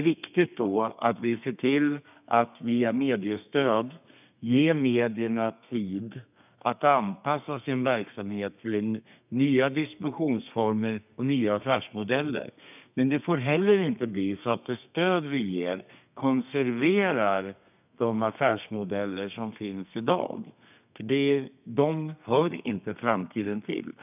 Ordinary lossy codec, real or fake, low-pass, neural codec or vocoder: none; fake; 3.6 kHz; codec, 32 kHz, 1.9 kbps, SNAC